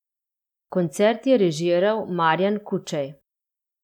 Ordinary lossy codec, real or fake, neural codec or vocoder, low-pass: none; real; none; 19.8 kHz